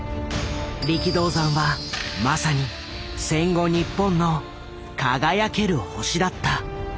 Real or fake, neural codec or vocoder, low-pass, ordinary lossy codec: real; none; none; none